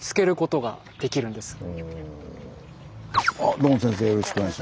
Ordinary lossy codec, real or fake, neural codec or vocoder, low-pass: none; real; none; none